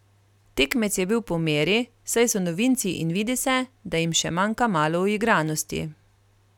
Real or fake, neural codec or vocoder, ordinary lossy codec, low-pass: real; none; none; 19.8 kHz